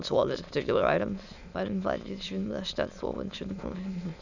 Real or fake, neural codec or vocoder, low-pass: fake; autoencoder, 22.05 kHz, a latent of 192 numbers a frame, VITS, trained on many speakers; 7.2 kHz